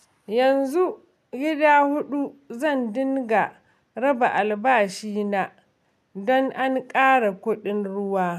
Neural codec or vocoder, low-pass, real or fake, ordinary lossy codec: none; 14.4 kHz; real; none